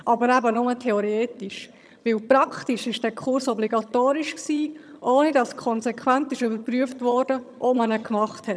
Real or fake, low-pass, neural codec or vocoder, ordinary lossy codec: fake; none; vocoder, 22.05 kHz, 80 mel bands, HiFi-GAN; none